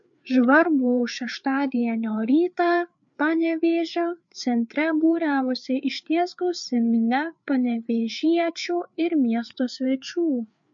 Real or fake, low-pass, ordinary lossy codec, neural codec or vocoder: fake; 7.2 kHz; MP3, 48 kbps; codec, 16 kHz, 4 kbps, FreqCodec, larger model